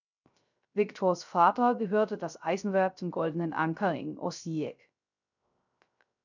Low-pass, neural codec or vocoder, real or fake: 7.2 kHz; codec, 16 kHz, 0.3 kbps, FocalCodec; fake